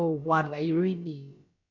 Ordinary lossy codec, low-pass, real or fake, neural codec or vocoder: none; 7.2 kHz; fake; codec, 16 kHz, about 1 kbps, DyCAST, with the encoder's durations